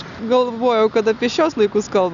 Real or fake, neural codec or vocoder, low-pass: real; none; 7.2 kHz